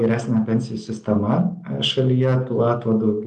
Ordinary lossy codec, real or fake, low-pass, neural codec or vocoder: Opus, 32 kbps; real; 10.8 kHz; none